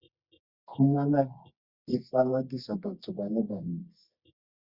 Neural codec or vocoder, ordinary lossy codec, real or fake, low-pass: codec, 24 kHz, 0.9 kbps, WavTokenizer, medium music audio release; Opus, 64 kbps; fake; 5.4 kHz